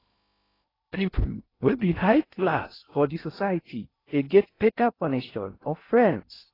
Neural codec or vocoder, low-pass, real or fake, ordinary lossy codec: codec, 16 kHz in and 24 kHz out, 0.6 kbps, FocalCodec, streaming, 2048 codes; 5.4 kHz; fake; AAC, 24 kbps